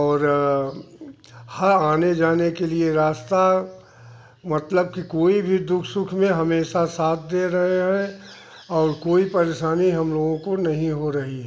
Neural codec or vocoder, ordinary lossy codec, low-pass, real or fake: none; none; none; real